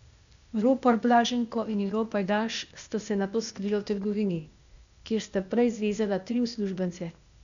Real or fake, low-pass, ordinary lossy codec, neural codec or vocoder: fake; 7.2 kHz; none; codec, 16 kHz, 0.8 kbps, ZipCodec